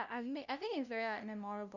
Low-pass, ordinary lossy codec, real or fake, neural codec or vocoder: 7.2 kHz; none; fake; codec, 16 kHz, 0.5 kbps, FunCodec, trained on LibriTTS, 25 frames a second